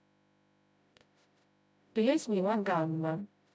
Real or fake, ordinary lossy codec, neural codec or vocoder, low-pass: fake; none; codec, 16 kHz, 0.5 kbps, FreqCodec, smaller model; none